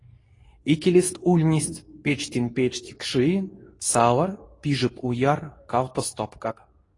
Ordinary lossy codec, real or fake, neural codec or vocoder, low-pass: AAC, 32 kbps; fake; codec, 24 kHz, 0.9 kbps, WavTokenizer, medium speech release version 2; 10.8 kHz